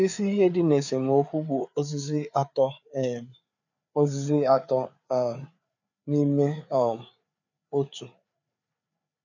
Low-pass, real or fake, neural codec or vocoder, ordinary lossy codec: 7.2 kHz; fake; codec, 16 kHz, 4 kbps, FreqCodec, larger model; none